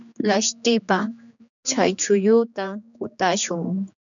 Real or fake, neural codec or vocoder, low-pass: fake; codec, 16 kHz, 2 kbps, X-Codec, HuBERT features, trained on general audio; 7.2 kHz